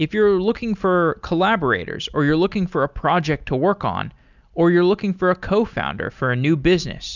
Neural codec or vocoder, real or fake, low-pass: none; real; 7.2 kHz